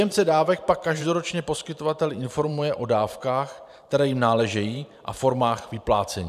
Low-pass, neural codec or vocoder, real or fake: 14.4 kHz; vocoder, 44.1 kHz, 128 mel bands every 512 samples, BigVGAN v2; fake